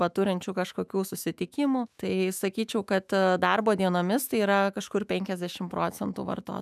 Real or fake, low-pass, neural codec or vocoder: fake; 14.4 kHz; autoencoder, 48 kHz, 128 numbers a frame, DAC-VAE, trained on Japanese speech